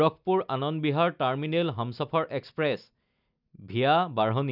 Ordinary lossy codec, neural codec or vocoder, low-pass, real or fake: none; none; 5.4 kHz; real